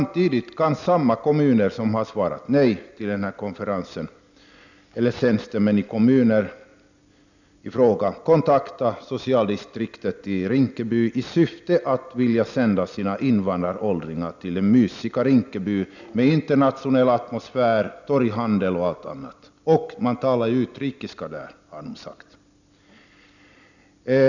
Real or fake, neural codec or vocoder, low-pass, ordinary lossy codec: real; none; 7.2 kHz; none